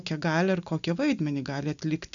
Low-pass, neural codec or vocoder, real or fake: 7.2 kHz; none; real